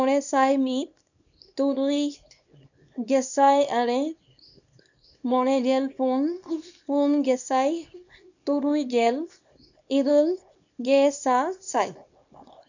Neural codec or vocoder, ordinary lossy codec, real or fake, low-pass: codec, 24 kHz, 0.9 kbps, WavTokenizer, small release; none; fake; 7.2 kHz